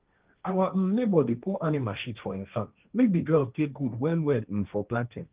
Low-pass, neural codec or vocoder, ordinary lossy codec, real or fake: 3.6 kHz; codec, 16 kHz, 1.1 kbps, Voila-Tokenizer; Opus, 24 kbps; fake